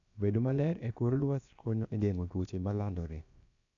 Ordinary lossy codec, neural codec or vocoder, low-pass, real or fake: none; codec, 16 kHz, about 1 kbps, DyCAST, with the encoder's durations; 7.2 kHz; fake